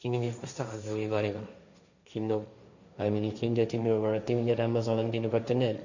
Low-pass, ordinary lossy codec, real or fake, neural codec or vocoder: 7.2 kHz; none; fake; codec, 16 kHz, 1.1 kbps, Voila-Tokenizer